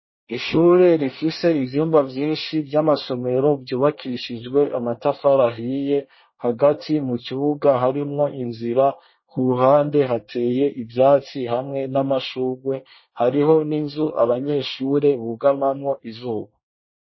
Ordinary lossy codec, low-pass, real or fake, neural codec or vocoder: MP3, 24 kbps; 7.2 kHz; fake; codec, 24 kHz, 1 kbps, SNAC